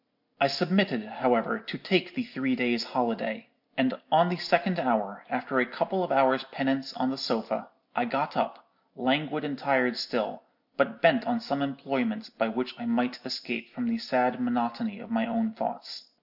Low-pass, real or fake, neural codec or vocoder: 5.4 kHz; real; none